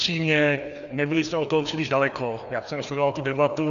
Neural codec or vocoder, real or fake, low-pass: codec, 16 kHz, 2 kbps, FreqCodec, larger model; fake; 7.2 kHz